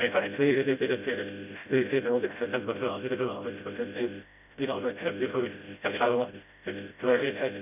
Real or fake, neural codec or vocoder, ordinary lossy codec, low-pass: fake; codec, 16 kHz, 0.5 kbps, FreqCodec, smaller model; none; 3.6 kHz